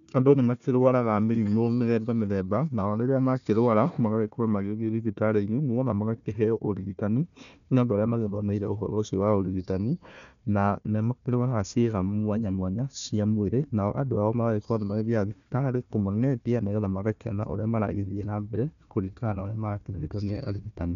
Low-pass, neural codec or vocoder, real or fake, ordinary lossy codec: 7.2 kHz; codec, 16 kHz, 1 kbps, FunCodec, trained on Chinese and English, 50 frames a second; fake; none